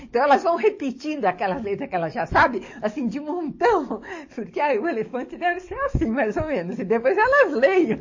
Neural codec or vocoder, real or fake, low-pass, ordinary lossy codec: codec, 44.1 kHz, 7.8 kbps, DAC; fake; 7.2 kHz; MP3, 32 kbps